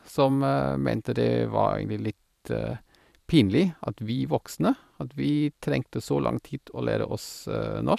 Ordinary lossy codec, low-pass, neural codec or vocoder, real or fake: none; 14.4 kHz; vocoder, 44.1 kHz, 128 mel bands every 512 samples, BigVGAN v2; fake